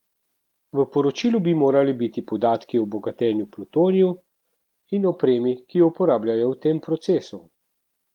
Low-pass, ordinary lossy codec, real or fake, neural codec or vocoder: 19.8 kHz; Opus, 24 kbps; real; none